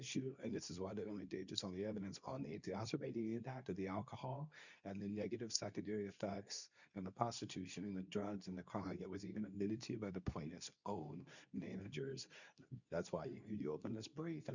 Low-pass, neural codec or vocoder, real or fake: 7.2 kHz; codec, 24 kHz, 0.9 kbps, WavTokenizer, medium speech release version 2; fake